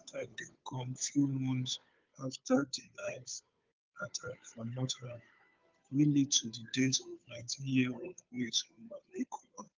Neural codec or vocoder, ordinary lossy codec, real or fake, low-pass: codec, 16 kHz, 2 kbps, FunCodec, trained on Chinese and English, 25 frames a second; Opus, 24 kbps; fake; 7.2 kHz